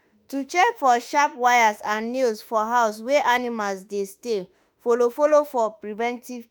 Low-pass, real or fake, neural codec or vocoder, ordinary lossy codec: none; fake; autoencoder, 48 kHz, 32 numbers a frame, DAC-VAE, trained on Japanese speech; none